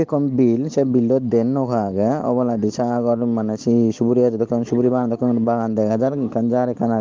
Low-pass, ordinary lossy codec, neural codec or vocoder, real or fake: 7.2 kHz; Opus, 16 kbps; none; real